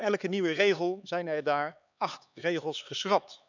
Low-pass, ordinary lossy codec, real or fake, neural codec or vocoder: 7.2 kHz; none; fake; codec, 16 kHz, 4 kbps, X-Codec, HuBERT features, trained on LibriSpeech